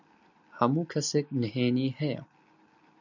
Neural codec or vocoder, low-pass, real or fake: none; 7.2 kHz; real